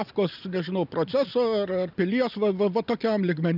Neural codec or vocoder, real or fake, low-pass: none; real; 5.4 kHz